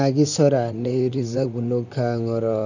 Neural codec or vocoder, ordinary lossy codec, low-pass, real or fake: vocoder, 44.1 kHz, 128 mel bands, Pupu-Vocoder; none; 7.2 kHz; fake